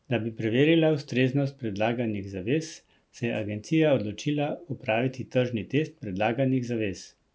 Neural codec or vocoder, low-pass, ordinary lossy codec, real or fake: none; none; none; real